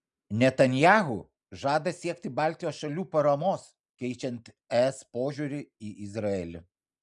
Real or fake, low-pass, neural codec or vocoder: real; 10.8 kHz; none